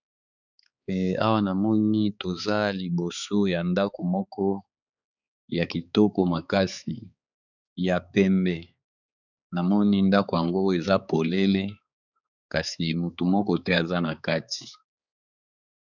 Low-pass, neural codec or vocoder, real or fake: 7.2 kHz; codec, 16 kHz, 4 kbps, X-Codec, HuBERT features, trained on balanced general audio; fake